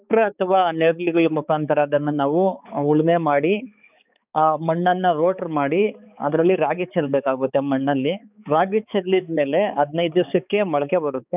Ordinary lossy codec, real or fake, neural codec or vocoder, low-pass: none; fake; codec, 16 kHz, 4 kbps, X-Codec, HuBERT features, trained on balanced general audio; 3.6 kHz